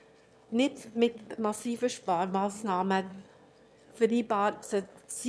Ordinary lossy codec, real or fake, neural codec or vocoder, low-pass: none; fake; autoencoder, 22.05 kHz, a latent of 192 numbers a frame, VITS, trained on one speaker; none